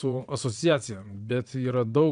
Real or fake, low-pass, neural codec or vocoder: fake; 9.9 kHz; vocoder, 22.05 kHz, 80 mel bands, Vocos